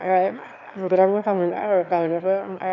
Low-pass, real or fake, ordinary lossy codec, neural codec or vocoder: 7.2 kHz; fake; none; autoencoder, 22.05 kHz, a latent of 192 numbers a frame, VITS, trained on one speaker